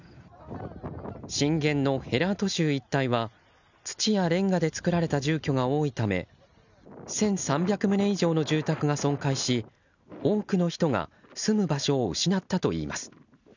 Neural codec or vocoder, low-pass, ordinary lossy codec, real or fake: none; 7.2 kHz; none; real